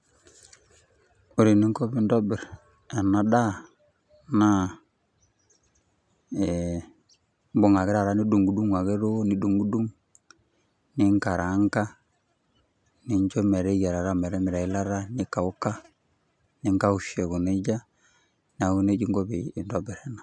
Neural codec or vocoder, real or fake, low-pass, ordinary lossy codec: none; real; 9.9 kHz; none